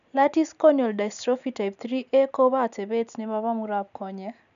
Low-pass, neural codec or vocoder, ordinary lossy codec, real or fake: 7.2 kHz; none; none; real